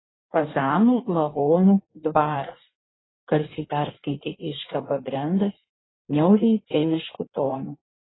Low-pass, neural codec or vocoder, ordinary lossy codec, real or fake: 7.2 kHz; codec, 16 kHz in and 24 kHz out, 1.1 kbps, FireRedTTS-2 codec; AAC, 16 kbps; fake